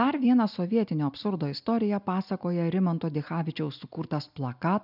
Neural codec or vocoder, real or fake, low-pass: none; real; 5.4 kHz